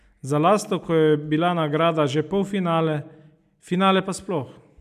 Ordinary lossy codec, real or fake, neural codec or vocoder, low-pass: none; real; none; 14.4 kHz